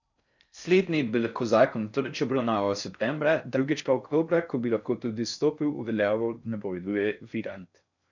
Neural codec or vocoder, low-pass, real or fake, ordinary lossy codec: codec, 16 kHz in and 24 kHz out, 0.6 kbps, FocalCodec, streaming, 4096 codes; 7.2 kHz; fake; none